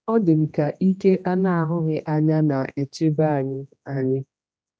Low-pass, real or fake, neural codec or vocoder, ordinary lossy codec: none; fake; codec, 16 kHz, 1 kbps, X-Codec, HuBERT features, trained on general audio; none